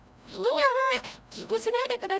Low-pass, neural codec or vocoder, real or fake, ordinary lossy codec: none; codec, 16 kHz, 0.5 kbps, FreqCodec, larger model; fake; none